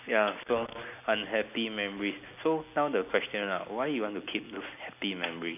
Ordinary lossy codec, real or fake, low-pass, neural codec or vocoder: none; real; 3.6 kHz; none